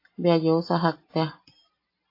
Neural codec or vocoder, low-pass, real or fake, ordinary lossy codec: none; 5.4 kHz; real; AAC, 32 kbps